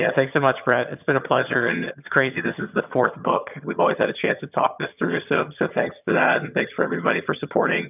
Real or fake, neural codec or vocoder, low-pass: fake; vocoder, 22.05 kHz, 80 mel bands, HiFi-GAN; 3.6 kHz